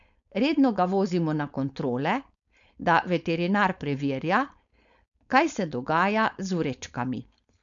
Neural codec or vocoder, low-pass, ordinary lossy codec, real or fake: codec, 16 kHz, 4.8 kbps, FACodec; 7.2 kHz; none; fake